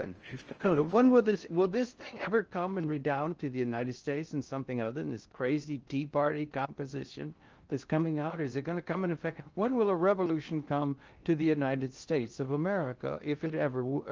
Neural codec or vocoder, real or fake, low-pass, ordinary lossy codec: codec, 16 kHz in and 24 kHz out, 0.6 kbps, FocalCodec, streaming, 4096 codes; fake; 7.2 kHz; Opus, 24 kbps